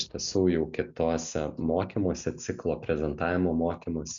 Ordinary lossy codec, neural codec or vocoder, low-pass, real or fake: MP3, 64 kbps; none; 7.2 kHz; real